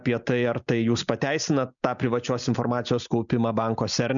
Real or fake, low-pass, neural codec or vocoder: real; 7.2 kHz; none